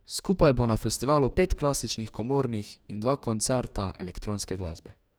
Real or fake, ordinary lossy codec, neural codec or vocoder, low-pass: fake; none; codec, 44.1 kHz, 2.6 kbps, DAC; none